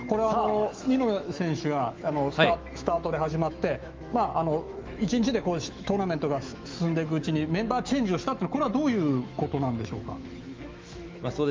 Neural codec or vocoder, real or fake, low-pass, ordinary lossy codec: autoencoder, 48 kHz, 128 numbers a frame, DAC-VAE, trained on Japanese speech; fake; 7.2 kHz; Opus, 32 kbps